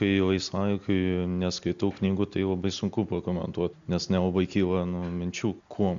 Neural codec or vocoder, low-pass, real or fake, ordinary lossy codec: none; 7.2 kHz; real; MP3, 64 kbps